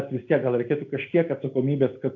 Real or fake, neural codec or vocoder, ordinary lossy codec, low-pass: real; none; AAC, 48 kbps; 7.2 kHz